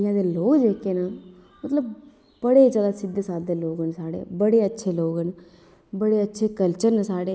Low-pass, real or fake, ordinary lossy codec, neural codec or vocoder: none; real; none; none